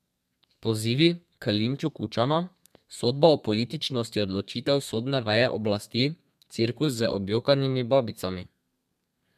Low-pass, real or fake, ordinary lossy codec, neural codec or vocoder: 14.4 kHz; fake; MP3, 96 kbps; codec, 32 kHz, 1.9 kbps, SNAC